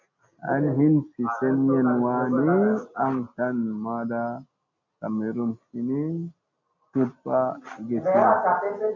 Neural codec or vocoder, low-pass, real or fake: none; 7.2 kHz; real